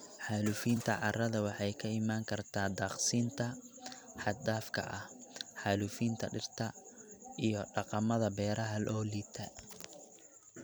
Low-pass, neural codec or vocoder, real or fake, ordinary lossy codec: none; vocoder, 44.1 kHz, 128 mel bands every 256 samples, BigVGAN v2; fake; none